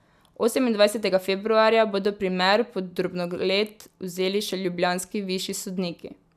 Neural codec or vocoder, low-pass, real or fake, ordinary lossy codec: none; 14.4 kHz; real; none